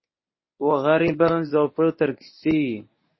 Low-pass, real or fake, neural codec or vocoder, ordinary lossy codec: 7.2 kHz; fake; codec, 24 kHz, 0.9 kbps, WavTokenizer, medium speech release version 1; MP3, 24 kbps